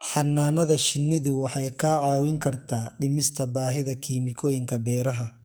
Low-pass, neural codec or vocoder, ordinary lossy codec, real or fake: none; codec, 44.1 kHz, 2.6 kbps, SNAC; none; fake